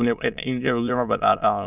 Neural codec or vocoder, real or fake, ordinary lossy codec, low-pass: autoencoder, 22.05 kHz, a latent of 192 numbers a frame, VITS, trained on many speakers; fake; none; 3.6 kHz